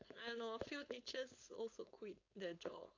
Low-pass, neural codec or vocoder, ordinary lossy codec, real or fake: 7.2 kHz; codec, 16 kHz, 4.8 kbps, FACodec; AAC, 48 kbps; fake